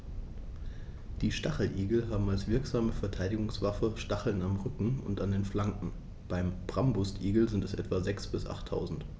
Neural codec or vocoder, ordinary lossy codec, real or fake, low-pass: none; none; real; none